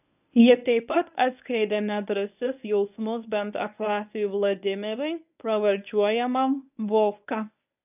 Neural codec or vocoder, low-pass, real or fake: codec, 24 kHz, 0.9 kbps, WavTokenizer, medium speech release version 1; 3.6 kHz; fake